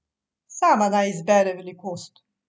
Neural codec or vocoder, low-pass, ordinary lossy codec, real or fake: none; none; none; real